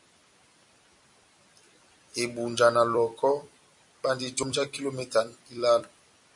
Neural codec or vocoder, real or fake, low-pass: vocoder, 44.1 kHz, 128 mel bands every 256 samples, BigVGAN v2; fake; 10.8 kHz